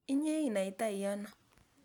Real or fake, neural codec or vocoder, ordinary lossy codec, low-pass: real; none; none; 19.8 kHz